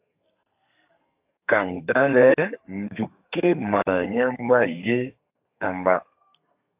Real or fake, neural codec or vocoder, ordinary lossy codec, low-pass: fake; codec, 32 kHz, 1.9 kbps, SNAC; AAC, 32 kbps; 3.6 kHz